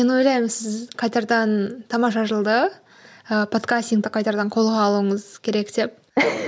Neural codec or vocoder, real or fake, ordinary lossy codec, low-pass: none; real; none; none